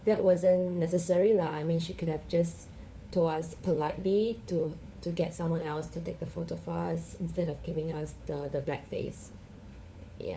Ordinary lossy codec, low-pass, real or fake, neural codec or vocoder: none; none; fake; codec, 16 kHz, 4 kbps, FunCodec, trained on LibriTTS, 50 frames a second